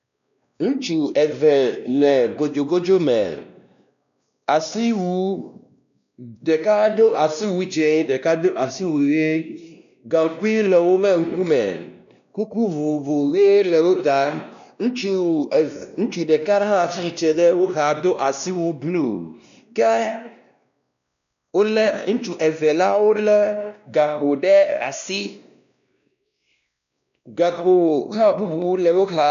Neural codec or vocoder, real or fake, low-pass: codec, 16 kHz, 1 kbps, X-Codec, WavLM features, trained on Multilingual LibriSpeech; fake; 7.2 kHz